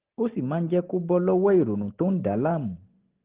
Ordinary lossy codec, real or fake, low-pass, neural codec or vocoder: Opus, 16 kbps; real; 3.6 kHz; none